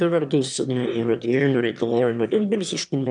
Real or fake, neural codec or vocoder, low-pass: fake; autoencoder, 22.05 kHz, a latent of 192 numbers a frame, VITS, trained on one speaker; 9.9 kHz